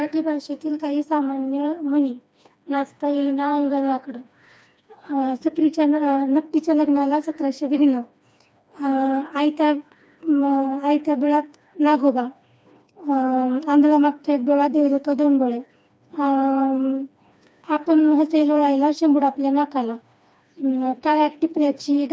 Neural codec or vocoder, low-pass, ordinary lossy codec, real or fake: codec, 16 kHz, 2 kbps, FreqCodec, smaller model; none; none; fake